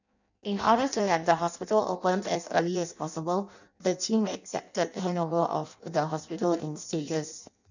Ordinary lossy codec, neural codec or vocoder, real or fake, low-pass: none; codec, 16 kHz in and 24 kHz out, 0.6 kbps, FireRedTTS-2 codec; fake; 7.2 kHz